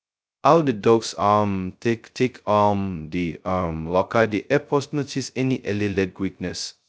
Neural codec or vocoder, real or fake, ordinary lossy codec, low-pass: codec, 16 kHz, 0.2 kbps, FocalCodec; fake; none; none